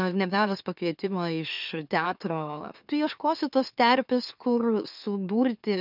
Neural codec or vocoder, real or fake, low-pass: autoencoder, 44.1 kHz, a latent of 192 numbers a frame, MeloTTS; fake; 5.4 kHz